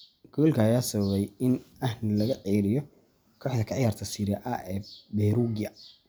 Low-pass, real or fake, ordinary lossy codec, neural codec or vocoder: none; real; none; none